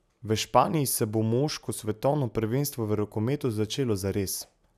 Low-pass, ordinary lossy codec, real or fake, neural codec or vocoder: 14.4 kHz; none; real; none